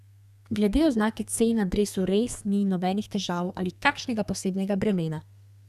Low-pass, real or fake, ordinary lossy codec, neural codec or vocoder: 14.4 kHz; fake; none; codec, 32 kHz, 1.9 kbps, SNAC